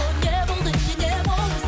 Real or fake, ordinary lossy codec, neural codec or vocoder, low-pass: real; none; none; none